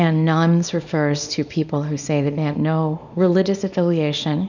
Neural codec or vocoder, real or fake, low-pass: codec, 24 kHz, 0.9 kbps, WavTokenizer, small release; fake; 7.2 kHz